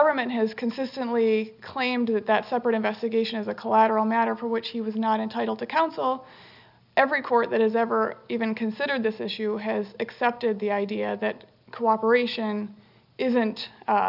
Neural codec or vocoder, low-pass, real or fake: none; 5.4 kHz; real